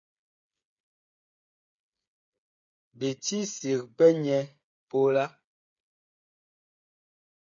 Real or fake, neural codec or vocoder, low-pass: fake; codec, 16 kHz, 8 kbps, FreqCodec, smaller model; 7.2 kHz